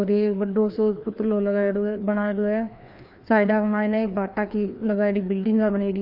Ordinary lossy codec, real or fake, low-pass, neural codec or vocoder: none; fake; 5.4 kHz; codec, 16 kHz, 2 kbps, FreqCodec, larger model